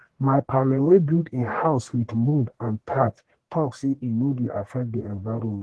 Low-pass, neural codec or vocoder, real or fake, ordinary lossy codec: 10.8 kHz; codec, 44.1 kHz, 1.7 kbps, Pupu-Codec; fake; Opus, 16 kbps